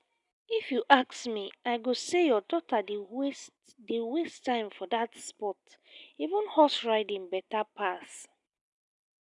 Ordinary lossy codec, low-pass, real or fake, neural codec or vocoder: none; 10.8 kHz; real; none